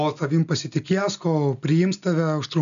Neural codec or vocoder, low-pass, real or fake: none; 7.2 kHz; real